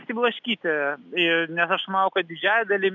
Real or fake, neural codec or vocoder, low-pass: real; none; 7.2 kHz